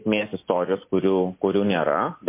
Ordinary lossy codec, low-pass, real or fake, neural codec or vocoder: MP3, 24 kbps; 3.6 kHz; real; none